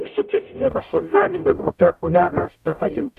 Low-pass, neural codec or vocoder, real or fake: 14.4 kHz; codec, 44.1 kHz, 0.9 kbps, DAC; fake